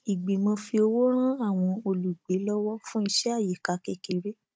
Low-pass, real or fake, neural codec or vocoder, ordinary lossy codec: none; fake; codec, 16 kHz, 16 kbps, FunCodec, trained on Chinese and English, 50 frames a second; none